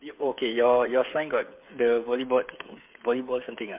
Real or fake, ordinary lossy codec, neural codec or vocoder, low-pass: fake; MP3, 32 kbps; codec, 16 kHz, 8 kbps, FreqCodec, smaller model; 3.6 kHz